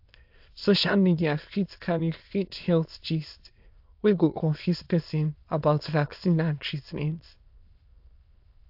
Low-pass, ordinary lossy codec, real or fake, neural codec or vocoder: 5.4 kHz; AAC, 48 kbps; fake; autoencoder, 22.05 kHz, a latent of 192 numbers a frame, VITS, trained on many speakers